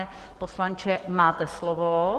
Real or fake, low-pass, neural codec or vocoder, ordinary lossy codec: fake; 14.4 kHz; codec, 44.1 kHz, 7.8 kbps, DAC; Opus, 16 kbps